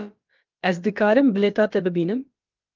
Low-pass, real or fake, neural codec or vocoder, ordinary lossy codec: 7.2 kHz; fake; codec, 16 kHz, about 1 kbps, DyCAST, with the encoder's durations; Opus, 24 kbps